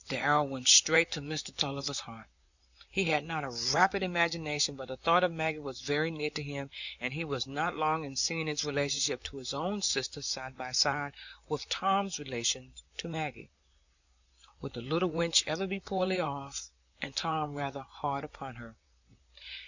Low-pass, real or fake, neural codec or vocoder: 7.2 kHz; fake; vocoder, 44.1 kHz, 128 mel bands, Pupu-Vocoder